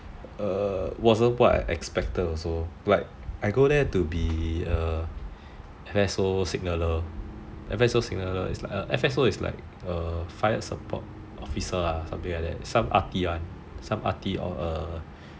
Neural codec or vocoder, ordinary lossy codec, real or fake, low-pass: none; none; real; none